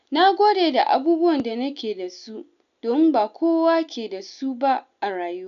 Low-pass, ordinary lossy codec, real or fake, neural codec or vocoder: 7.2 kHz; none; real; none